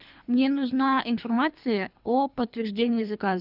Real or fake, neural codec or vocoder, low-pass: fake; codec, 24 kHz, 3 kbps, HILCodec; 5.4 kHz